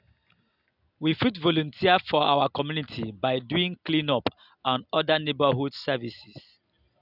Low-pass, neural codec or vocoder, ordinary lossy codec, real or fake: 5.4 kHz; none; none; real